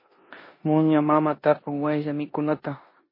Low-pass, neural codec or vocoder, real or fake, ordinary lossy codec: 5.4 kHz; codec, 16 kHz in and 24 kHz out, 0.9 kbps, LongCat-Audio-Codec, four codebook decoder; fake; MP3, 24 kbps